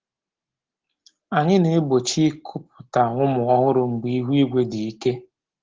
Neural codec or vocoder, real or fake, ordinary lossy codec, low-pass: none; real; Opus, 16 kbps; 7.2 kHz